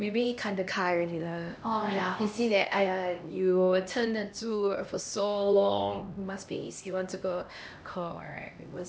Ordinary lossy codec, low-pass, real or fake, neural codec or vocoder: none; none; fake; codec, 16 kHz, 1 kbps, X-Codec, HuBERT features, trained on LibriSpeech